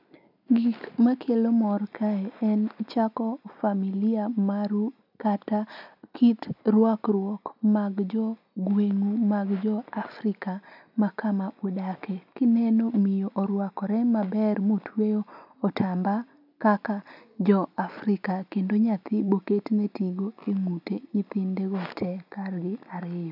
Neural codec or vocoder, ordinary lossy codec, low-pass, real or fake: none; none; 5.4 kHz; real